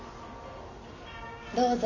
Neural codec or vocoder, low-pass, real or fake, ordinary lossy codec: none; 7.2 kHz; real; none